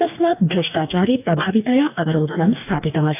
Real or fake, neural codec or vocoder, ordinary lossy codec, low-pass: fake; codec, 44.1 kHz, 2.6 kbps, DAC; none; 3.6 kHz